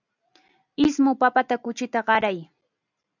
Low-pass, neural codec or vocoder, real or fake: 7.2 kHz; none; real